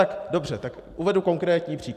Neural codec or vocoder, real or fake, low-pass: vocoder, 44.1 kHz, 128 mel bands every 512 samples, BigVGAN v2; fake; 14.4 kHz